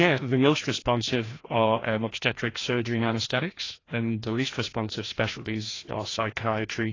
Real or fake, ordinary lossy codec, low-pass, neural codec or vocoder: fake; AAC, 32 kbps; 7.2 kHz; codec, 16 kHz, 1 kbps, FreqCodec, larger model